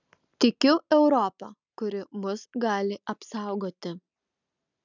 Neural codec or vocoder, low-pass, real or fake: none; 7.2 kHz; real